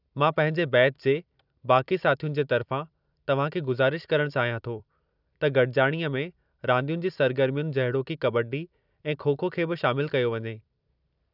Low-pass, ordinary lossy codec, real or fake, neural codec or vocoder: 5.4 kHz; none; fake; vocoder, 44.1 kHz, 128 mel bands every 256 samples, BigVGAN v2